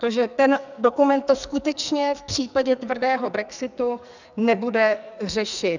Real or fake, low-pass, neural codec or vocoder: fake; 7.2 kHz; codec, 44.1 kHz, 2.6 kbps, SNAC